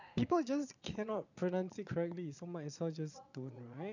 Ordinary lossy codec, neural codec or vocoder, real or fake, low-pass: none; vocoder, 22.05 kHz, 80 mel bands, Vocos; fake; 7.2 kHz